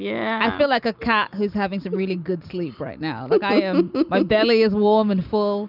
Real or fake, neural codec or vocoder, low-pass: real; none; 5.4 kHz